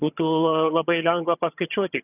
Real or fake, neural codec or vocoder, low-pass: fake; vocoder, 22.05 kHz, 80 mel bands, HiFi-GAN; 3.6 kHz